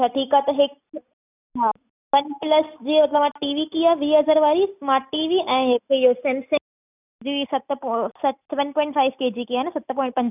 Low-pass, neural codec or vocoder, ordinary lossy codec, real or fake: 3.6 kHz; none; none; real